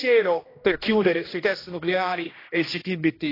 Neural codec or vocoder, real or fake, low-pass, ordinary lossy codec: codec, 16 kHz, 1 kbps, X-Codec, HuBERT features, trained on general audio; fake; 5.4 kHz; AAC, 24 kbps